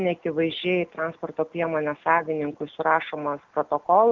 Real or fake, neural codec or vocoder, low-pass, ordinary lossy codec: real; none; 7.2 kHz; Opus, 24 kbps